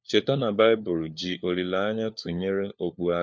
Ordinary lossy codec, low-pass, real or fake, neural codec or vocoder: none; none; fake; codec, 16 kHz, 4 kbps, FunCodec, trained on LibriTTS, 50 frames a second